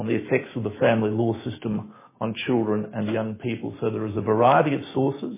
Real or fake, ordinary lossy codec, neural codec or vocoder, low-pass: fake; MP3, 16 kbps; autoencoder, 48 kHz, 128 numbers a frame, DAC-VAE, trained on Japanese speech; 3.6 kHz